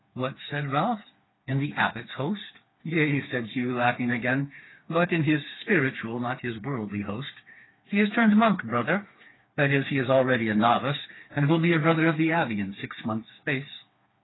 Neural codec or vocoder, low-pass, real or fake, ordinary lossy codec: codec, 16 kHz, 4 kbps, FreqCodec, smaller model; 7.2 kHz; fake; AAC, 16 kbps